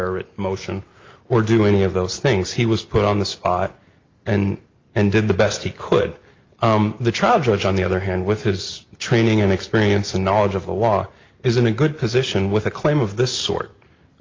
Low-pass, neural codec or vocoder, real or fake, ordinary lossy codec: 7.2 kHz; none; real; Opus, 24 kbps